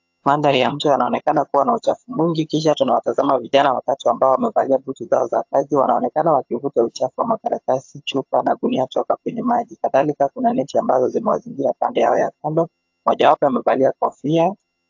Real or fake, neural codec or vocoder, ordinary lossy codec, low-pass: fake; vocoder, 22.05 kHz, 80 mel bands, HiFi-GAN; AAC, 48 kbps; 7.2 kHz